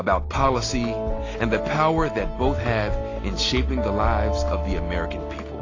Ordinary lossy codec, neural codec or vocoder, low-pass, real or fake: AAC, 32 kbps; none; 7.2 kHz; real